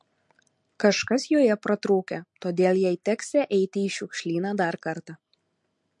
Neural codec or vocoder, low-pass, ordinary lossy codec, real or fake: none; 10.8 kHz; MP3, 48 kbps; real